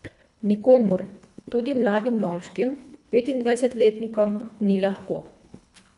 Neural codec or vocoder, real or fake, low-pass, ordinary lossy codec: codec, 24 kHz, 1.5 kbps, HILCodec; fake; 10.8 kHz; none